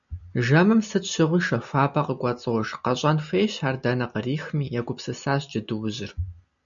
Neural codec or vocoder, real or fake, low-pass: none; real; 7.2 kHz